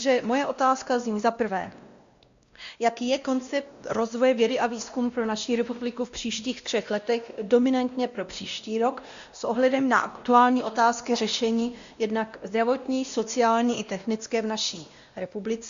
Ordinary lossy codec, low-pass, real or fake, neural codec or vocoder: Opus, 64 kbps; 7.2 kHz; fake; codec, 16 kHz, 1 kbps, X-Codec, WavLM features, trained on Multilingual LibriSpeech